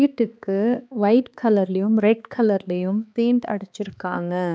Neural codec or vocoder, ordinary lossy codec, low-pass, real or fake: codec, 16 kHz, 2 kbps, X-Codec, HuBERT features, trained on balanced general audio; none; none; fake